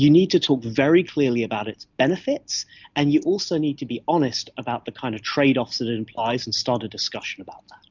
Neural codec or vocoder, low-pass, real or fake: none; 7.2 kHz; real